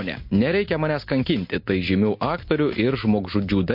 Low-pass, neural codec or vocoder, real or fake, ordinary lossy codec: 5.4 kHz; none; real; MP3, 32 kbps